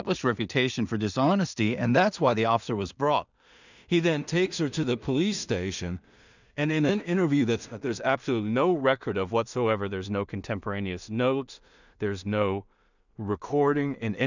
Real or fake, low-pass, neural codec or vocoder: fake; 7.2 kHz; codec, 16 kHz in and 24 kHz out, 0.4 kbps, LongCat-Audio-Codec, two codebook decoder